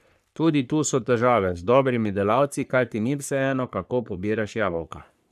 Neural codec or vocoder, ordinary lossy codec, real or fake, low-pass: codec, 44.1 kHz, 3.4 kbps, Pupu-Codec; none; fake; 14.4 kHz